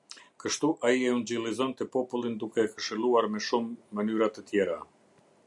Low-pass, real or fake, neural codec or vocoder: 10.8 kHz; real; none